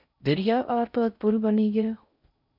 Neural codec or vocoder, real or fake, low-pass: codec, 16 kHz in and 24 kHz out, 0.6 kbps, FocalCodec, streaming, 4096 codes; fake; 5.4 kHz